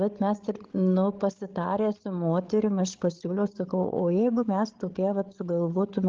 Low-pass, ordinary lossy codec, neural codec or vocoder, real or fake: 7.2 kHz; Opus, 16 kbps; codec, 16 kHz, 16 kbps, FreqCodec, larger model; fake